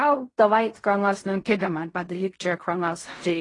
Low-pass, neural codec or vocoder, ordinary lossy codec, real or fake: 10.8 kHz; codec, 16 kHz in and 24 kHz out, 0.4 kbps, LongCat-Audio-Codec, fine tuned four codebook decoder; AAC, 32 kbps; fake